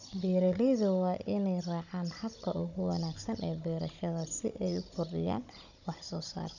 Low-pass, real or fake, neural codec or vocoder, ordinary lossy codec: 7.2 kHz; fake; codec, 16 kHz, 16 kbps, FunCodec, trained on Chinese and English, 50 frames a second; none